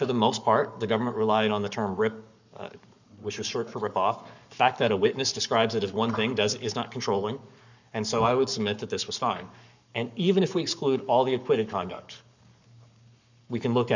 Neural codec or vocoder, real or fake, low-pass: codec, 44.1 kHz, 7.8 kbps, Pupu-Codec; fake; 7.2 kHz